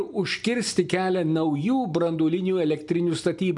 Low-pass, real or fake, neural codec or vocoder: 10.8 kHz; real; none